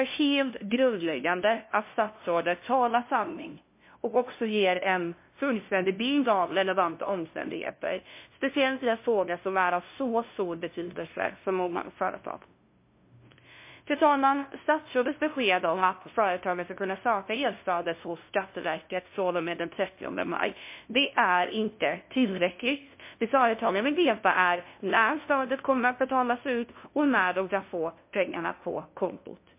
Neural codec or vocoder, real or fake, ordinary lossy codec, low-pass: codec, 16 kHz, 0.5 kbps, FunCodec, trained on LibriTTS, 25 frames a second; fake; MP3, 24 kbps; 3.6 kHz